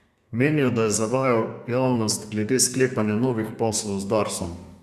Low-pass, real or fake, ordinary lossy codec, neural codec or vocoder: 14.4 kHz; fake; Opus, 64 kbps; codec, 32 kHz, 1.9 kbps, SNAC